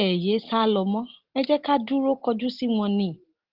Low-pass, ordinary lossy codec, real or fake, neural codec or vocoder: 5.4 kHz; Opus, 16 kbps; real; none